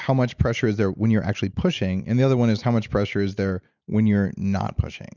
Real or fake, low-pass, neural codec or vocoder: real; 7.2 kHz; none